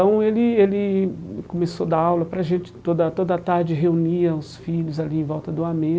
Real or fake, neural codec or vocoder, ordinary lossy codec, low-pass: real; none; none; none